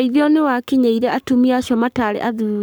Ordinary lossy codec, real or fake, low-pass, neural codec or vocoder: none; fake; none; codec, 44.1 kHz, 7.8 kbps, Pupu-Codec